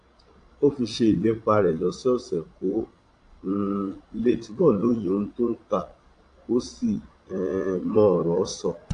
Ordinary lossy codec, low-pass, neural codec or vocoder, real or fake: AAC, 48 kbps; 9.9 kHz; vocoder, 22.05 kHz, 80 mel bands, Vocos; fake